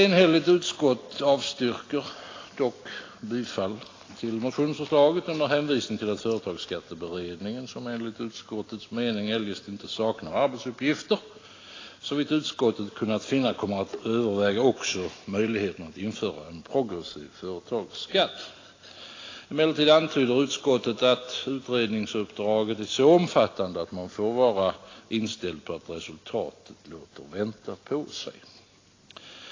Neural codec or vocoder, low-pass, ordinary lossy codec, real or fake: none; 7.2 kHz; AAC, 32 kbps; real